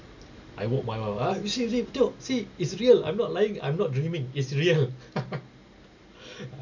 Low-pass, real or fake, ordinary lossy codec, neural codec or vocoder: 7.2 kHz; real; none; none